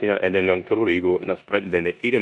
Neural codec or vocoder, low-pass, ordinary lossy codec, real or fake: codec, 16 kHz in and 24 kHz out, 0.9 kbps, LongCat-Audio-Codec, four codebook decoder; 10.8 kHz; MP3, 96 kbps; fake